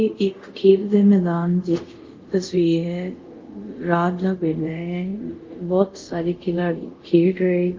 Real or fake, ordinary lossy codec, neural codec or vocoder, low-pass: fake; Opus, 32 kbps; codec, 24 kHz, 0.5 kbps, DualCodec; 7.2 kHz